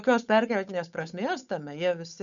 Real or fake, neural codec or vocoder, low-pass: fake; codec, 16 kHz, 8 kbps, FunCodec, trained on Chinese and English, 25 frames a second; 7.2 kHz